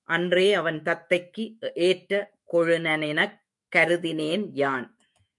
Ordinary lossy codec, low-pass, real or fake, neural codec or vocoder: MP3, 64 kbps; 10.8 kHz; fake; autoencoder, 48 kHz, 128 numbers a frame, DAC-VAE, trained on Japanese speech